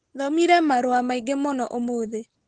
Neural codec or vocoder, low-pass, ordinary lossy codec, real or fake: none; 9.9 kHz; Opus, 16 kbps; real